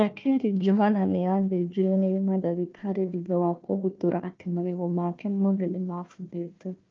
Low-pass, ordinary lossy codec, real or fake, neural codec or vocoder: 7.2 kHz; Opus, 24 kbps; fake; codec, 16 kHz, 1 kbps, FunCodec, trained on Chinese and English, 50 frames a second